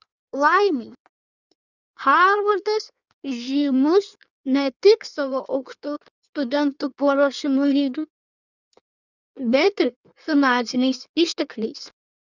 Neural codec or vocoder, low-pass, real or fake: codec, 16 kHz in and 24 kHz out, 1.1 kbps, FireRedTTS-2 codec; 7.2 kHz; fake